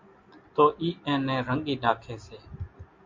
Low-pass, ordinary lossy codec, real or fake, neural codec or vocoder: 7.2 kHz; MP3, 48 kbps; real; none